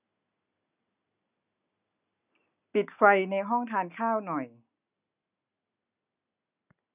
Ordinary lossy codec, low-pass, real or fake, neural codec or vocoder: none; 3.6 kHz; real; none